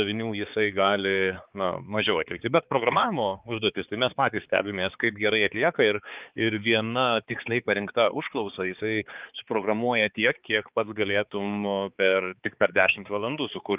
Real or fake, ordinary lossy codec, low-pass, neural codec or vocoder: fake; Opus, 24 kbps; 3.6 kHz; codec, 16 kHz, 4 kbps, X-Codec, HuBERT features, trained on balanced general audio